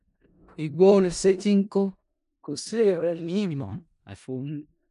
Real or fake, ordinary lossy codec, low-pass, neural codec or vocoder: fake; none; 10.8 kHz; codec, 16 kHz in and 24 kHz out, 0.4 kbps, LongCat-Audio-Codec, four codebook decoder